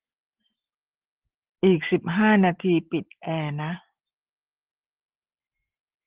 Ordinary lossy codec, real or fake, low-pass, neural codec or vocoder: Opus, 16 kbps; real; 3.6 kHz; none